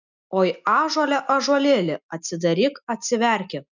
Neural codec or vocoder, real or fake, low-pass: none; real; 7.2 kHz